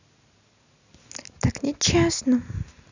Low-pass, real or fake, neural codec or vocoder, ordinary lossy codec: 7.2 kHz; real; none; none